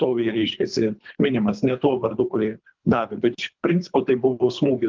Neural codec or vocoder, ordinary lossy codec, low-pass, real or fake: codec, 24 kHz, 3 kbps, HILCodec; Opus, 32 kbps; 7.2 kHz; fake